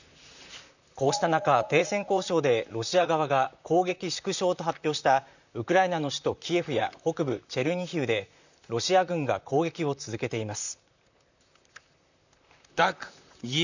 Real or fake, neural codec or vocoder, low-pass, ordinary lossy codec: fake; vocoder, 44.1 kHz, 128 mel bands, Pupu-Vocoder; 7.2 kHz; none